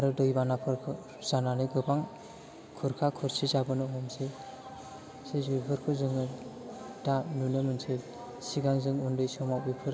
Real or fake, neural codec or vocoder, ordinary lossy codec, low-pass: real; none; none; none